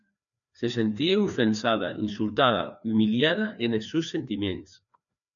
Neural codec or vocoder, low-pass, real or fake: codec, 16 kHz, 2 kbps, FreqCodec, larger model; 7.2 kHz; fake